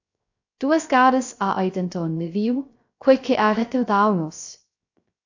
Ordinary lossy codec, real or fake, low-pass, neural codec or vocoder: AAC, 48 kbps; fake; 7.2 kHz; codec, 16 kHz, 0.3 kbps, FocalCodec